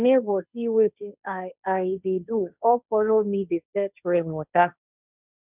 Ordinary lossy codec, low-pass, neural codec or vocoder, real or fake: none; 3.6 kHz; codec, 16 kHz, 1.1 kbps, Voila-Tokenizer; fake